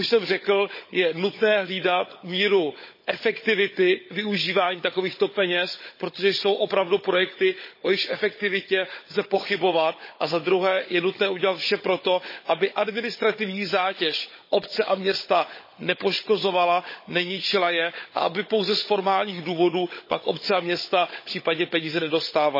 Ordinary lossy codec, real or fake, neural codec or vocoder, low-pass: MP3, 24 kbps; fake; codec, 16 kHz, 16 kbps, FunCodec, trained on Chinese and English, 50 frames a second; 5.4 kHz